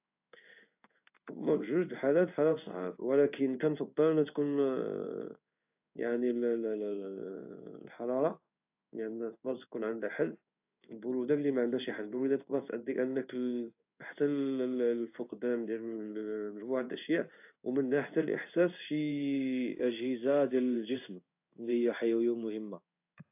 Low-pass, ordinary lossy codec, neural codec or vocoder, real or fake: 3.6 kHz; AAC, 32 kbps; codec, 16 kHz in and 24 kHz out, 1 kbps, XY-Tokenizer; fake